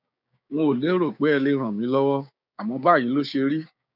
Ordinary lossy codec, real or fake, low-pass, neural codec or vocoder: none; fake; 5.4 kHz; codec, 16 kHz, 6 kbps, DAC